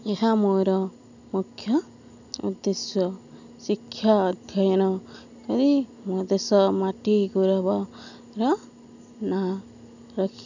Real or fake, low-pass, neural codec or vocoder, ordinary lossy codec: real; 7.2 kHz; none; none